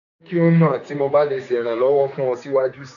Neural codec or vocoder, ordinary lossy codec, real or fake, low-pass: codec, 16 kHz, 4 kbps, X-Codec, HuBERT features, trained on general audio; none; fake; 7.2 kHz